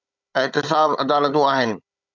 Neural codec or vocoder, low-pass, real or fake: codec, 16 kHz, 16 kbps, FunCodec, trained on Chinese and English, 50 frames a second; 7.2 kHz; fake